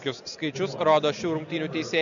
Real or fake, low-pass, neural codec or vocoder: real; 7.2 kHz; none